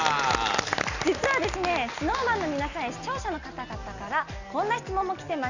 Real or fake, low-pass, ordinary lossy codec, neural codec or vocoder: real; 7.2 kHz; none; none